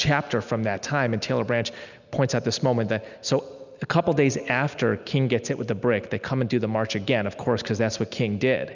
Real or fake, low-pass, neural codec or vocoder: real; 7.2 kHz; none